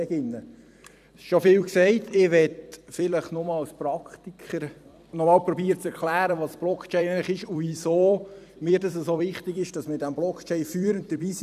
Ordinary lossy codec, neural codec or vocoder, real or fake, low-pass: none; vocoder, 44.1 kHz, 128 mel bands every 256 samples, BigVGAN v2; fake; 14.4 kHz